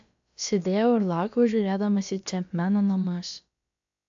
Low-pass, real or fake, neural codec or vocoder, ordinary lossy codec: 7.2 kHz; fake; codec, 16 kHz, about 1 kbps, DyCAST, with the encoder's durations; AAC, 64 kbps